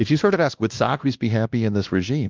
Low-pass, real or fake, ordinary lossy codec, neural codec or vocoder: 7.2 kHz; fake; Opus, 32 kbps; codec, 16 kHz, 1 kbps, X-Codec, WavLM features, trained on Multilingual LibriSpeech